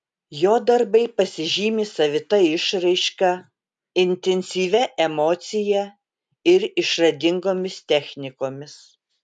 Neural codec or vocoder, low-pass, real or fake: none; 10.8 kHz; real